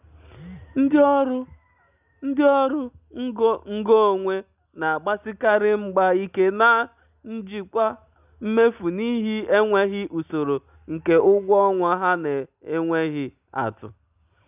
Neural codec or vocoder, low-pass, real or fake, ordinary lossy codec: none; 3.6 kHz; real; none